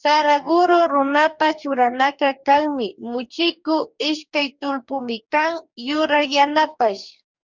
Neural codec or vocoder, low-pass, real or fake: codec, 44.1 kHz, 2.6 kbps, DAC; 7.2 kHz; fake